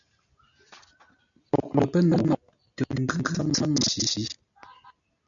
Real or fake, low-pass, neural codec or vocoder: real; 7.2 kHz; none